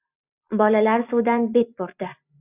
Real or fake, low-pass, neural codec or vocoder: real; 3.6 kHz; none